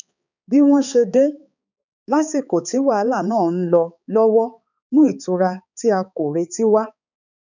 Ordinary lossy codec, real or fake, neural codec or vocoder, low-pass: none; fake; codec, 16 kHz, 4 kbps, X-Codec, HuBERT features, trained on balanced general audio; 7.2 kHz